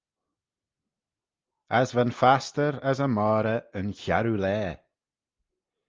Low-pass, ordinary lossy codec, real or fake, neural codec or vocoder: 7.2 kHz; Opus, 24 kbps; real; none